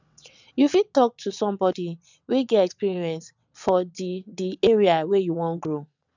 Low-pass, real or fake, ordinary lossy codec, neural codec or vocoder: 7.2 kHz; fake; none; vocoder, 22.05 kHz, 80 mel bands, WaveNeXt